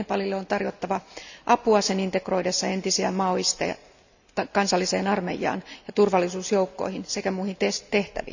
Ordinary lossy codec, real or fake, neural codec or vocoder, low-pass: none; real; none; 7.2 kHz